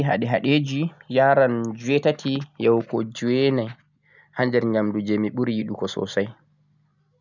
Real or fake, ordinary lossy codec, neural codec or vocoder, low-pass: real; none; none; 7.2 kHz